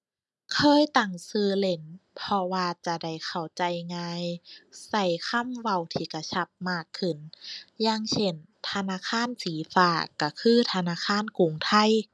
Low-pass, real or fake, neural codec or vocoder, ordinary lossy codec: none; real; none; none